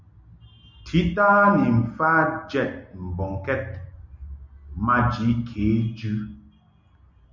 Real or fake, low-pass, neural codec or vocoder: real; 7.2 kHz; none